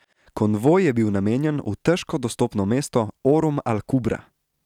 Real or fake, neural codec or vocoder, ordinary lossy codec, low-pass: real; none; none; 19.8 kHz